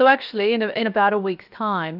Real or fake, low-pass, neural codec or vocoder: fake; 5.4 kHz; codec, 16 kHz, 0.7 kbps, FocalCodec